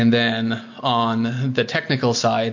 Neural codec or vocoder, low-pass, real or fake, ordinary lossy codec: none; 7.2 kHz; real; MP3, 48 kbps